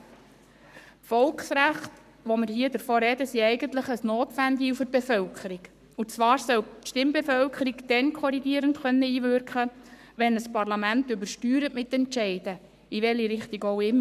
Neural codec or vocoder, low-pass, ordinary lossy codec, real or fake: codec, 44.1 kHz, 7.8 kbps, Pupu-Codec; 14.4 kHz; none; fake